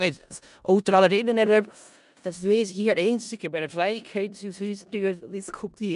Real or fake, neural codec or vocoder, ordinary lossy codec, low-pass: fake; codec, 16 kHz in and 24 kHz out, 0.4 kbps, LongCat-Audio-Codec, four codebook decoder; none; 10.8 kHz